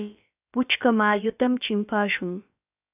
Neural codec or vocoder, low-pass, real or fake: codec, 16 kHz, about 1 kbps, DyCAST, with the encoder's durations; 3.6 kHz; fake